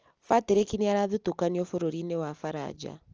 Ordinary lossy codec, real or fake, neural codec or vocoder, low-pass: Opus, 24 kbps; real; none; 7.2 kHz